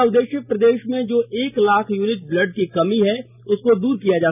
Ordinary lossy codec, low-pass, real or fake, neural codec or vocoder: none; 3.6 kHz; real; none